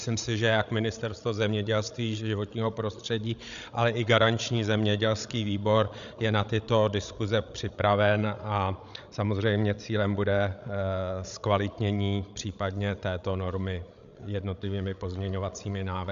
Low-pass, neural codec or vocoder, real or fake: 7.2 kHz; codec, 16 kHz, 16 kbps, FreqCodec, larger model; fake